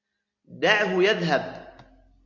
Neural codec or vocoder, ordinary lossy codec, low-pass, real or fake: none; Opus, 64 kbps; 7.2 kHz; real